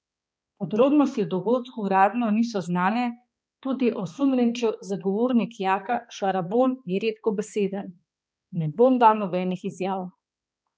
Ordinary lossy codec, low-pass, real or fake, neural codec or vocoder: none; none; fake; codec, 16 kHz, 2 kbps, X-Codec, HuBERT features, trained on balanced general audio